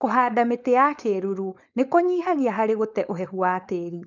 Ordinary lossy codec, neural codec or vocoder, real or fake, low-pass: none; codec, 16 kHz, 4.8 kbps, FACodec; fake; 7.2 kHz